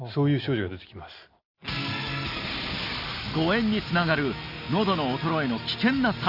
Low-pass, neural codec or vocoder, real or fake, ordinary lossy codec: 5.4 kHz; none; real; none